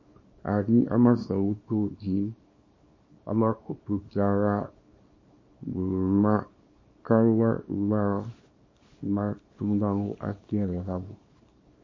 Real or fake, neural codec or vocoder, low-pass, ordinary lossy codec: fake; codec, 24 kHz, 0.9 kbps, WavTokenizer, small release; 7.2 kHz; MP3, 32 kbps